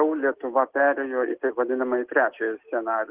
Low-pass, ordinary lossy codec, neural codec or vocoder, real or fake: 3.6 kHz; Opus, 16 kbps; none; real